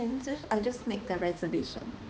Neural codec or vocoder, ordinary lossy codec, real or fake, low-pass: codec, 16 kHz, 2 kbps, X-Codec, HuBERT features, trained on balanced general audio; none; fake; none